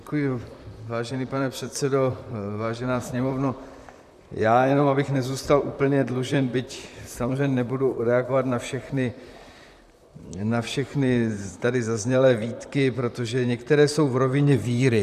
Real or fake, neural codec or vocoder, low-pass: fake; vocoder, 44.1 kHz, 128 mel bands, Pupu-Vocoder; 14.4 kHz